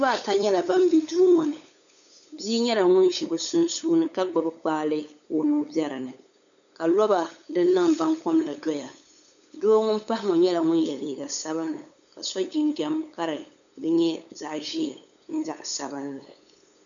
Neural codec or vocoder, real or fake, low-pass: codec, 16 kHz, 8 kbps, FunCodec, trained on LibriTTS, 25 frames a second; fake; 7.2 kHz